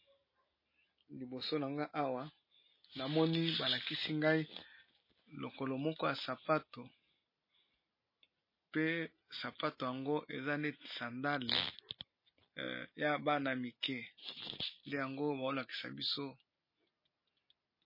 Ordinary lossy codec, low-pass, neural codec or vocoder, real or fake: MP3, 24 kbps; 5.4 kHz; none; real